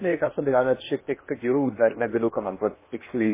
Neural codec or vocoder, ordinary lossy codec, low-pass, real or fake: codec, 16 kHz in and 24 kHz out, 0.8 kbps, FocalCodec, streaming, 65536 codes; MP3, 16 kbps; 3.6 kHz; fake